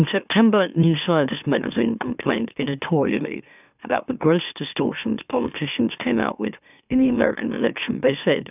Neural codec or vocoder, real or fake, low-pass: autoencoder, 44.1 kHz, a latent of 192 numbers a frame, MeloTTS; fake; 3.6 kHz